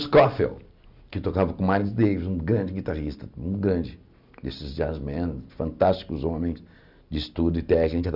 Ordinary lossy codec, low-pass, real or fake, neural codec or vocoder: none; 5.4 kHz; real; none